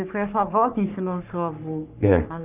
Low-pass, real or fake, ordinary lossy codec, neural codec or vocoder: 3.6 kHz; fake; none; codec, 44.1 kHz, 3.4 kbps, Pupu-Codec